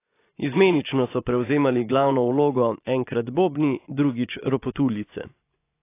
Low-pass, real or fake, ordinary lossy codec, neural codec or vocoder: 3.6 kHz; fake; AAC, 24 kbps; vocoder, 24 kHz, 100 mel bands, Vocos